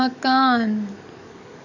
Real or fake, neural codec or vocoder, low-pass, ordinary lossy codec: fake; codec, 44.1 kHz, 7.8 kbps, DAC; 7.2 kHz; none